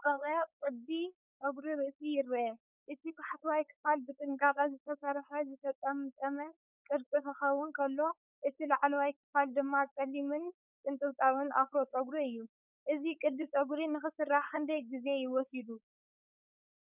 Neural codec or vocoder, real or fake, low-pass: codec, 16 kHz, 4.8 kbps, FACodec; fake; 3.6 kHz